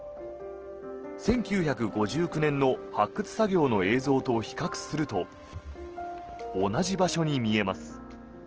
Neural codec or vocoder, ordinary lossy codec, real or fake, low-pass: none; Opus, 16 kbps; real; 7.2 kHz